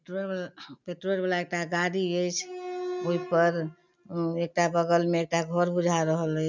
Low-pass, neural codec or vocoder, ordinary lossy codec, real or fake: 7.2 kHz; none; none; real